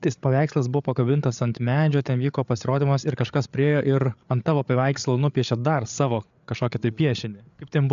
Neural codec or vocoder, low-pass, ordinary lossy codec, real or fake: codec, 16 kHz, 8 kbps, FreqCodec, larger model; 7.2 kHz; AAC, 96 kbps; fake